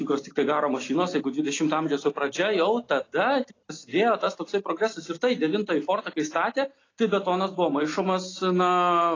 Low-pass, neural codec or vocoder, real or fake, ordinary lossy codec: 7.2 kHz; none; real; AAC, 32 kbps